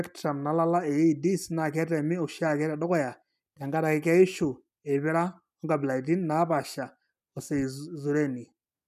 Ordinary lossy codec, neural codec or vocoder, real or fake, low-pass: none; none; real; 14.4 kHz